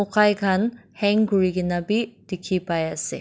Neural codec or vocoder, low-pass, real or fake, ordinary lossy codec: none; none; real; none